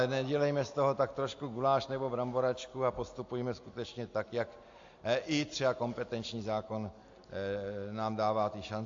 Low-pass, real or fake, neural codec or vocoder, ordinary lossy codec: 7.2 kHz; real; none; AAC, 48 kbps